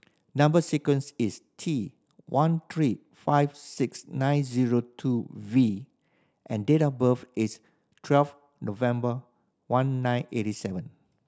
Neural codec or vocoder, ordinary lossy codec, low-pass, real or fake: none; none; none; real